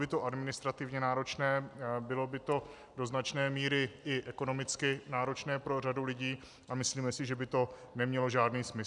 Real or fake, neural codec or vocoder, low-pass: real; none; 10.8 kHz